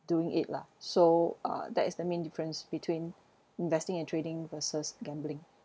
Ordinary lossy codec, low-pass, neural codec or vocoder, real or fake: none; none; none; real